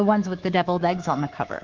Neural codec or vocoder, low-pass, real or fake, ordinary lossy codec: codec, 24 kHz, 3.1 kbps, DualCodec; 7.2 kHz; fake; Opus, 16 kbps